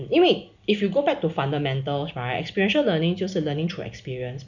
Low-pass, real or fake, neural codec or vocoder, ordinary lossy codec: 7.2 kHz; real; none; none